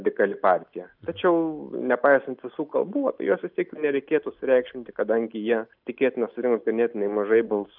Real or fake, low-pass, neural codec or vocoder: real; 5.4 kHz; none